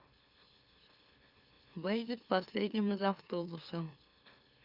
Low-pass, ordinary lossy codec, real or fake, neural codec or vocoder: 5.4 kHz; Opus, 64 kbps; fake; autoencoder, 44.1 kHz, a latent of 192 numbers a frame, MeloTTS